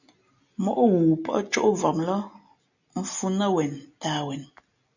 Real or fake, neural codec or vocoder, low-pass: real; none; 7.2 kHz